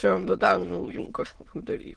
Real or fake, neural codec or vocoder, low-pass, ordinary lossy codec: fake; autoencoder, 22.05 kHz, a latent of 192 numbers a frame, VITS, trained on many speakers; 9.9 kHz; Opus, 16 kbps